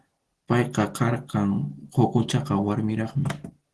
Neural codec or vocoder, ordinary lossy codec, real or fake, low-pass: none; Opus, 16 kbps; real; 10.8 kHz